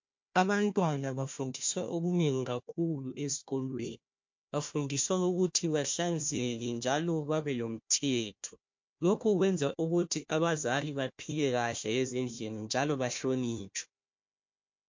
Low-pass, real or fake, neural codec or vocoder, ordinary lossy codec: 7.2 kHz; fake; codec, 16 kHz, 1 kbps, FunCodec, trained on Chinese and English, 50 frames a second; MP3, 48 kbps